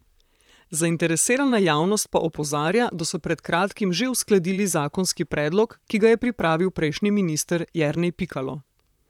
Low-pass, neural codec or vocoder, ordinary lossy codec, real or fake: 19.8 kHz; vocoder, 44.1 kHz, 128 mel bands, Pupu-Vocoder; none; fake